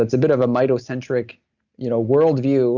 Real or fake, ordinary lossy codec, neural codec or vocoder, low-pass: real; Opus, 64 kbps; none; 7.2 kHz